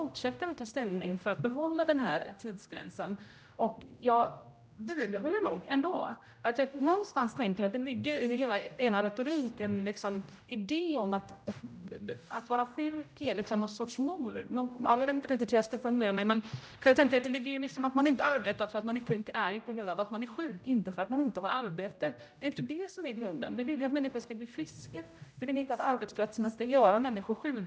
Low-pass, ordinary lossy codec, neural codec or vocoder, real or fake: none; none; codec, 16 kHz, 0.5 kbps, X-Codec, HuBERT features, trained on general audio; fake